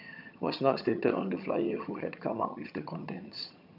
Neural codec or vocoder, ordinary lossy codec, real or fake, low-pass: vocoder, 22.05 kHz, 80 mel bands, HiFi-GAN; none; fake; 5.4 kHz